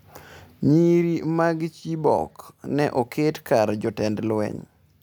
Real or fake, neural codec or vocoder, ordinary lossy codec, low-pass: real; none; none; none